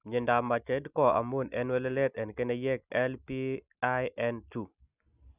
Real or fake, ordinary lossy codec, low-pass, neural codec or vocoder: real; none; 3.6 kHz; none